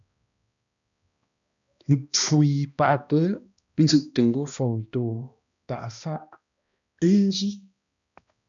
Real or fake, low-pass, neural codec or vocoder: fake; 7.2 kHz; codec, 16 kHz, 1 kbps, X-Codec, HuBERT features, trained on balanced general audio